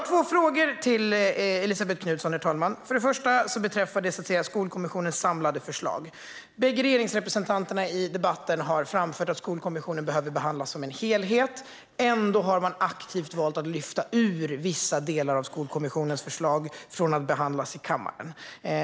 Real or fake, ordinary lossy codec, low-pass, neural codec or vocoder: real; none; none; none